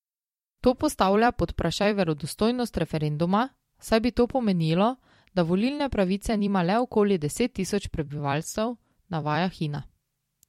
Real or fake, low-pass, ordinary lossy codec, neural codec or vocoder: fake; 19.8 kHz; MP3, 64 kbps; vocoder, 44.1 kHz, 128 mel bands every 256 samples, BigVGAN v2